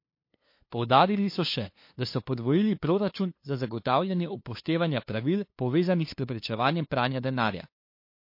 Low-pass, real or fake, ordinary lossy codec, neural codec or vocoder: 5.4 kHz; fake; MP3, 32 kbps; codec, 16 kHz, 2 kbps, FunCodec, trained on LibriTTS, 25 frames a second